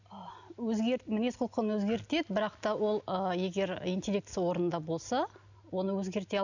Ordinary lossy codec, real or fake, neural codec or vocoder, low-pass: none; real; none; 7.2 kHz